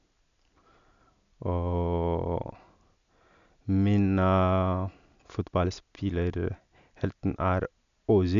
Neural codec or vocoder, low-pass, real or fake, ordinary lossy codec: none; 7.2 kHz; real; none